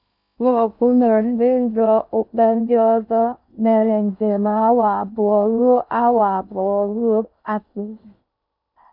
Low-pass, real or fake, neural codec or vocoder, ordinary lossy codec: 5.4 kHz; fake; codec, 16 kHz in and 24 kHz out, 0.6 kbps, FocalCodec, streaming, 2048 codes; none